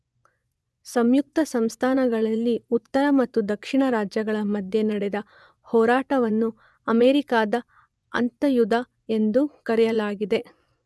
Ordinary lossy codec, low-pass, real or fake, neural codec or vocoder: none; none; fake; vocoder, 24 kHz, 100 mel bands, Vocos